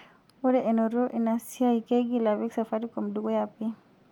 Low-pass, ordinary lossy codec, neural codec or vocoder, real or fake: 19.8 kHz; none; none; real